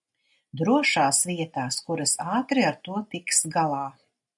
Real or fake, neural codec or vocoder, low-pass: real; none; 10.8 kHz